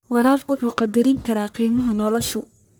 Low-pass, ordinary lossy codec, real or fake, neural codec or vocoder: none; none; fake; codec, 44.1 kHz, 1.7 kbps, Pupu-Codec